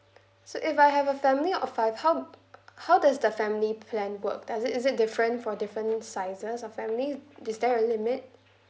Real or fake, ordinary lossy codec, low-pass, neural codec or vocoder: real; none; none; none